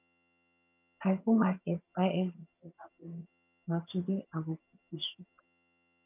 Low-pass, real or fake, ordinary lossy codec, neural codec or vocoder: 3.6 kHz; fake; none; vocoder, 22.05 kHz, 80 mel bands, HiFi-GAN